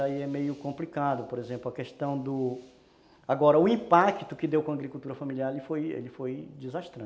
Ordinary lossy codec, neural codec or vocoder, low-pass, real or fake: none; none; none; real